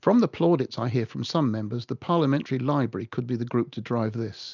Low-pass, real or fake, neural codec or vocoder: 7.2 kHz; real; none